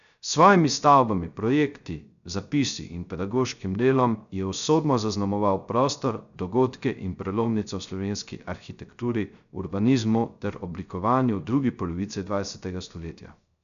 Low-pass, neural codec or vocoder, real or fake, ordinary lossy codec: 7.2 kHz; codec, 16 kHz, 0.3 kbps, FocalCodec; fake; none